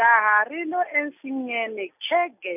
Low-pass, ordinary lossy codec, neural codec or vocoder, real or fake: 3.6 kHz; none; none; real